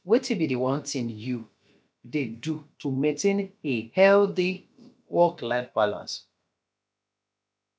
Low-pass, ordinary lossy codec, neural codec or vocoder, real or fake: none; none; codec, 16 kHz, about 1 kbps, DyCAST, with the encoder's durations; fake